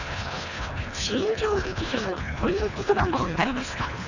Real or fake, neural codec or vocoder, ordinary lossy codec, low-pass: fake; codec, 24 kHz, 1.5 kbps, HILCodec; none; 7.2 kHz